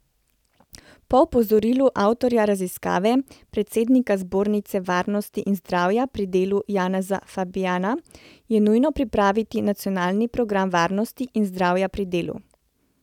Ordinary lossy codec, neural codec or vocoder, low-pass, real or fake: none; none; 19.8 kHz; real